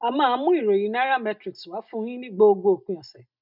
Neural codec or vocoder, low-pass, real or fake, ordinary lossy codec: none; 5.4 kHz; real; none